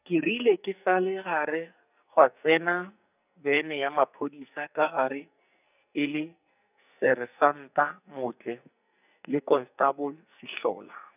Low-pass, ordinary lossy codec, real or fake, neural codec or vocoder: 3.6 kHz; none; fake; codec, 44.1 kHz, 2.6 kbps, SNAC